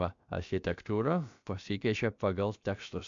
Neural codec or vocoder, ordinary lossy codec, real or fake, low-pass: codec, 16 kHz, about 1 kbps, DyCAST, with the encoder's durations; MP3, 64 kbps; fake; 7.2 kHz